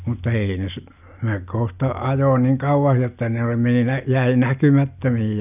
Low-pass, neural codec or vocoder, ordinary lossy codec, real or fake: 3.6 kHz; none; none; real